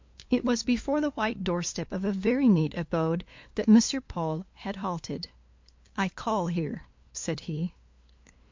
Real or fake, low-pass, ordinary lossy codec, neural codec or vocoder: fake; 7.2 kHz; MP3, 48 kbps; codec, 16 kHz, 2 kbps, FunCodec, trained on LibriTTS, 25 frames a second